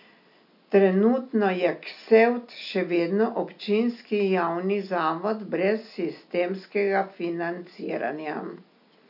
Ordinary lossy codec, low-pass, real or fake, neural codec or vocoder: none; 5.4 kHz; real; none